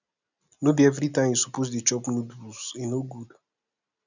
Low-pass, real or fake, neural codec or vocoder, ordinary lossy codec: 7.2 kHz; real; none; none